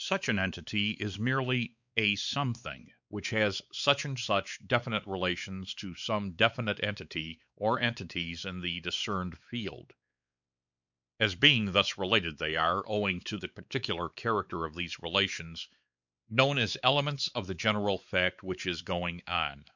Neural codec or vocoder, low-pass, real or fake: codec, 16 kHz, 4 kbps, X-Codec, WavLM features, trained on Multilingual LibriSpeech; 7.2 kHz; fake